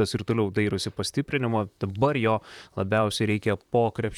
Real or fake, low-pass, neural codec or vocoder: fake; 19.8 kHz; vocoder, 44.1 kHz, 128 mel bands, Pupu-Vocoder